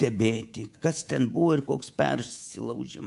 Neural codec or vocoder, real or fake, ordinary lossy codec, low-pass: codec, 24 kHz, 3.1 kbps, DualCodec; fake; AAC, 64 kbps; 10.8 kHz